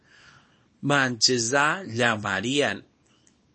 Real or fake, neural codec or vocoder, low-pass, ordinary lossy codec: fake; codec, 24 kHz, 0.9 kbps, WavTokenizer, small release; 10.8 kHz; MP3, 32 kbps